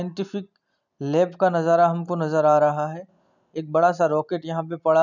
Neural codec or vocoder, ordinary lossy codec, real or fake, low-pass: none; none; real; 7.2 kHz